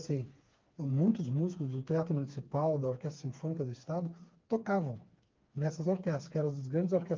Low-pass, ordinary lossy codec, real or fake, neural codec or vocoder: 7.2 kHz; Opus, 32 kbps; fake; codec, 16 kHz, 4 kbps, FreqCodec, smaller model